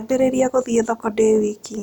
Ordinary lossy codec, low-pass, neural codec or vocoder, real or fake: none; 19.8 kHz; none; real